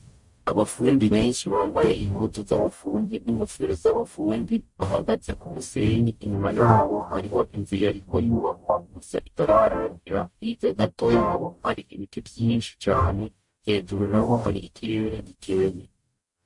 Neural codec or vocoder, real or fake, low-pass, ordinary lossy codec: codec, 44.1 kHz, 0.9 kbps, DAC; fake; 10.8 kHz; MP3, 48 kbps